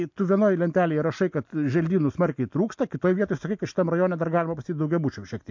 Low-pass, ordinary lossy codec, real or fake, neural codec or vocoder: 7.2 kHz; MP3, 48 kbps; real; none